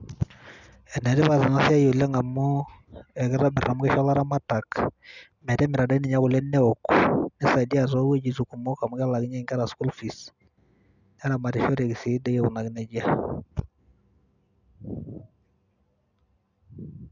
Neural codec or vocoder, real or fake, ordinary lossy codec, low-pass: none; real; none; 7.2 kHz